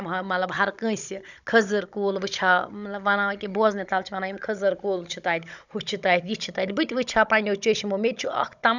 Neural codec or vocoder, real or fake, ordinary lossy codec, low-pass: codec, 16 kHz, 16 kbps, FunCodec, trained on Chinese and English, 50 frames a second; fake; none; 7.2 kHz